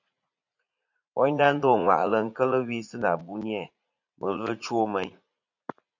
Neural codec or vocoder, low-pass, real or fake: vocoder, 44.1 kHz, 80 mel bands, Vocos; 7.2 kHz; fake